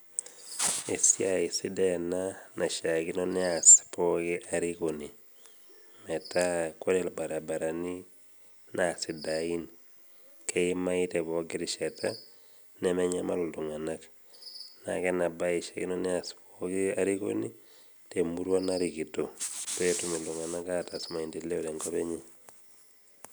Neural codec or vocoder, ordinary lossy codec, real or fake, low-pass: none; none; real; none